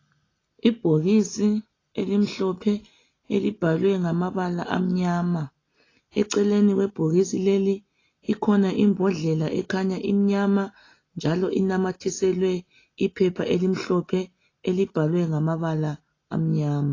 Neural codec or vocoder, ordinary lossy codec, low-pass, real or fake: none; AAC, 32 kbps; 7.2 kHz; real